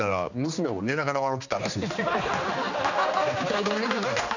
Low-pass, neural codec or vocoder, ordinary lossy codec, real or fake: 7.2 kHz; codec, 16 kHz, 2 kbps, X-Codec, HuBERT features, trained on general audio; none; fake